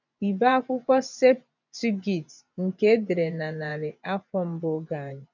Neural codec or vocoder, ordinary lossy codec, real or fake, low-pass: vocoder, 24 kHz, 100 mel bands, Vocos; none; fake; 7.2 kHz